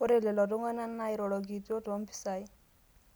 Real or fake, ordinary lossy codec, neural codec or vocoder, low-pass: real; none; none; none